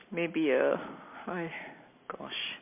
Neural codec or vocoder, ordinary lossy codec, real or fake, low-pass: none; MP3, 24 kbps; real; 3.6 kHz